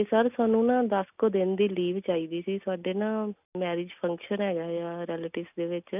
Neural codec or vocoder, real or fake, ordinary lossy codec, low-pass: none; real; none; 3.6 kHz